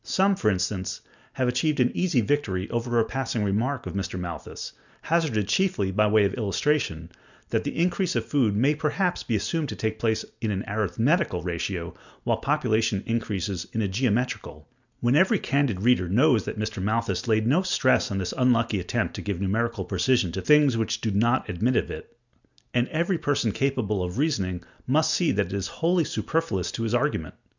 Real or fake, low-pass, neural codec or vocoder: real; 7.2 kHz; none